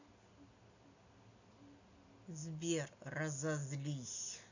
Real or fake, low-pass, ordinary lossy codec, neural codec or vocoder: real; 7.2 kHz; none; none